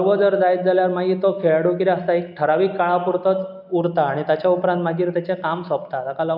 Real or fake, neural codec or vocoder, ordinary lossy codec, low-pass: real; none; none; 5.4 kHz